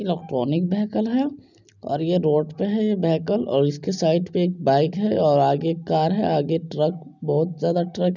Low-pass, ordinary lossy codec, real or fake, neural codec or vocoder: 7.2 kHz; none; real; none